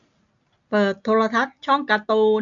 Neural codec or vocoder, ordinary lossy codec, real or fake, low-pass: none; AAC, 64 kbps; real; 7.2 kHz